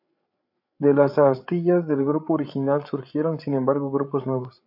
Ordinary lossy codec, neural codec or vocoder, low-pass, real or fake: AAC, 48 kbps; codec, 16 kHz, 8 kbps, FreqCodec, larger model; 5.4 kHz; fake